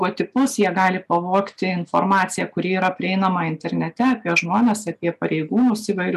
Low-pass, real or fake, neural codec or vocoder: 14.4 kHz; real; none